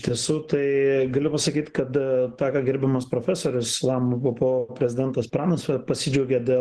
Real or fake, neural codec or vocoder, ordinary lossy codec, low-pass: real; none; Opus, 16 kbps; 10.8 kHz